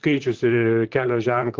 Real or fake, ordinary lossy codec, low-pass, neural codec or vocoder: fake; Opus, 16 kbps; 7.2 kHz; vocoder, 44.1 kHz, 128 mel bands, Pupu-Vocoder